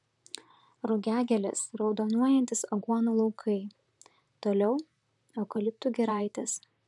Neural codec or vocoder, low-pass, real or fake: vocoder, 44.1 kHz, 128 mel bands, Pupu-Vocoder; 10.8 kHz; fake